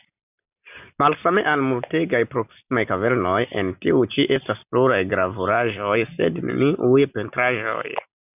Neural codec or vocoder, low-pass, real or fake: none; 3.6 kHz; real